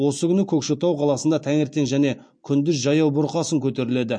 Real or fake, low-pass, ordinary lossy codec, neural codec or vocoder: real; 9.9 kHz; MP3, 48 kbps; none